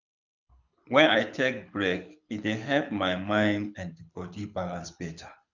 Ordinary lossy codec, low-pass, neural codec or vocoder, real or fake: none; 7.2 kHz; codec, 24 kHz, 6 kbps, HILCodec; fake